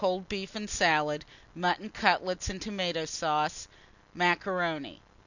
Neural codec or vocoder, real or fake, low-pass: none; real; 7.2 kHz